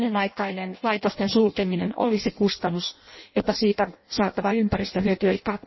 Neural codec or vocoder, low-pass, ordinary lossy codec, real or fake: codec, 16 kHz in and 24 kHz out, 0.6 kbps, FireRedTTS-2 codec; 7.2 kHz; MP3, 24 kbps; fake